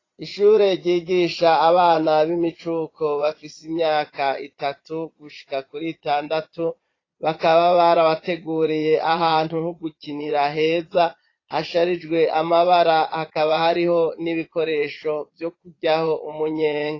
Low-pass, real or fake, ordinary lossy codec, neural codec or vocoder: 7.2 kHz; fake; AAC, 32 kbps; vocoder, 22.05 kHz, 80 mel bands, Vocos